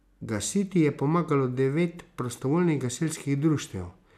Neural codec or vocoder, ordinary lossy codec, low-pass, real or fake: none; none; 14.4 kHz; real